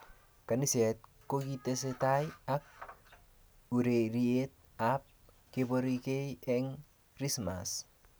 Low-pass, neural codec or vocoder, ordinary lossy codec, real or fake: none; none; none; real